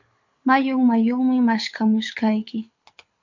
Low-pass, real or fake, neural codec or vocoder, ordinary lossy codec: 7.2 kHz; fake; codec, 24 kHz, 6 kbps, HILCodec; MP3, 64 kbps